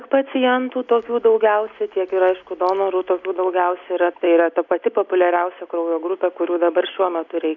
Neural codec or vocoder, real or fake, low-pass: none; real; 7.2 kHz